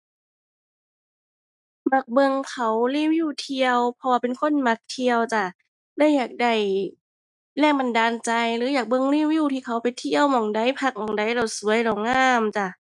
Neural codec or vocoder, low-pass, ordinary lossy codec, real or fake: none; 10.8 kHz; none; real